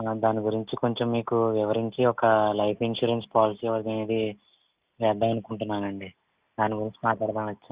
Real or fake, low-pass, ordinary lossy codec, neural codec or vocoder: real; 3.6 kHz; Opus, 64 kbps; none